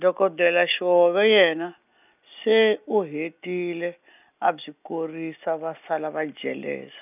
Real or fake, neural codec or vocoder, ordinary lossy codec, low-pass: real; none; none; 3.6 kHz